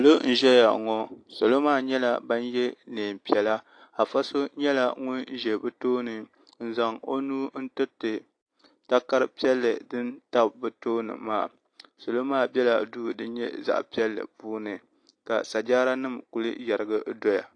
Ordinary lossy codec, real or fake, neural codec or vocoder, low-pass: AAC, 48 kbps; real; none; 9.9 kHz